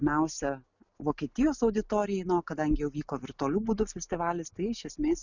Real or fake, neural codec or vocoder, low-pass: real; none; 7.2 kHz